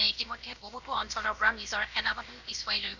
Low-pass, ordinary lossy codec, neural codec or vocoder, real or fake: 7.2 kHz; none; codec, 16 kHz, about 1 kbps, DyCAST, with the encoder's durations; fake